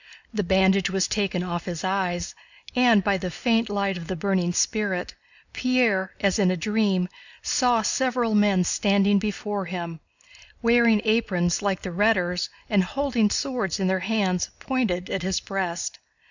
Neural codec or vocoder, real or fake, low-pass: none; real; 7.2 kHz